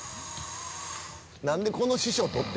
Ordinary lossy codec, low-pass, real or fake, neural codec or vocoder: none; none; real; none